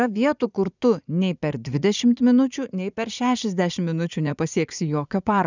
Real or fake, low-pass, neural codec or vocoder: fake; 7.2 kHz; vocoder, 44.1 kHz, 80 mel bands, Vocos